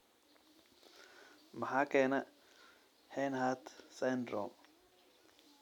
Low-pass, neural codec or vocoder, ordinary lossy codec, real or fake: 19.8 kHz; none; none; real